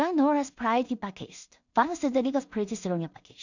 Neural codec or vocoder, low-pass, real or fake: codec, 16 kHz in and 24 kHz out, 0.4 kbps, LongCat-Audio-Codec, two codebook decoder; 7.2 kHz; fake